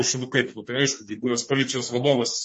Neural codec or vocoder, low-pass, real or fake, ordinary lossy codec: codec, 32 kHz, 1.9 kbps, SNAC; 10.8 kHz; fake; MP3, 32 kbps